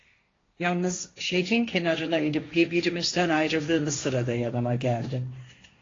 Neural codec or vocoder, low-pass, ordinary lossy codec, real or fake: codec, 16 kHz, 1.1 kbps, Voila-Tokenizer; 7.2 kHz; AAC, 32 kbps; fake